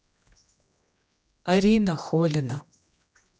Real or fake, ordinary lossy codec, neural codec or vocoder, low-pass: fake; none; codec, 16 kHz, 1 kbps, X-Codec, HuBERT features, trained on general audio; none